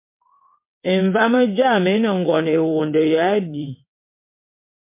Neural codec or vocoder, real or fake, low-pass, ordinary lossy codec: vocoder, 22.05 kHz, 80 mel bands, WaveNeXt; fake; 3.6 kHz; MP3, 24 kbps